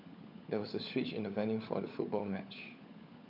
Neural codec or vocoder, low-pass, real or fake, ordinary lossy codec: codec, 16 kHz, 16 kbps, FunCodec, trained on LibriTTS, 50 frames a second; 5.4 kHz; fake; none